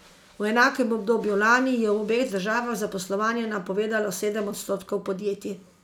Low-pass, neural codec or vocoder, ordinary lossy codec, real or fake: 19.8 kHz; none; none; real